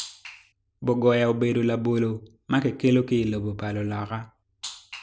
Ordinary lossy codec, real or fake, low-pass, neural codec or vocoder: none; real; none; none